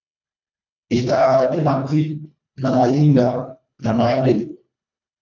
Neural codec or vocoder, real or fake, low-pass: codec, 24 kHz, 1.5 kbps, HILCodec; fake; 7.2 kHz